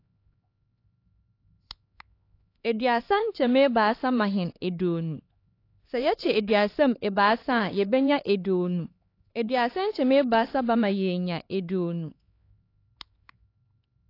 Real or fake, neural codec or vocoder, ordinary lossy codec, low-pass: fake; codec, 16 kHz, 4 kbps, X-Codec, HuBERT features, trained on LibriSpeech; AAC, 32 kbps; 5.4 kHz